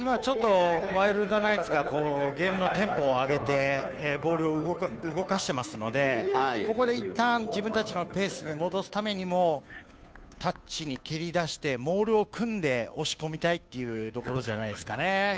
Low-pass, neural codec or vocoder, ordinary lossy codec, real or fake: none; codec, 16 kHz, 2 kbps, FunCodec, trained on Chinese and English, 25 frames a second; none; fake